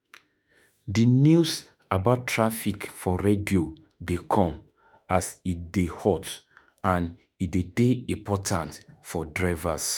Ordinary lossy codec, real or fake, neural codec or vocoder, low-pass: none; fake; autoencoder, 48 kHz, 32 numbers a frame, DAC-VAE, trained on Japanese speech; none